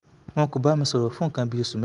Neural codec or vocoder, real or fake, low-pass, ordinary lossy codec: none; real; 10.8 kHz; Opus, 32 kbps